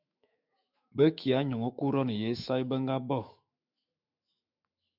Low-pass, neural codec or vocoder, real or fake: 5.4 kHz; autoencoder, 48 kHz, 128 numbers a frame, DAC-VAE, trained on Japanese speech; fake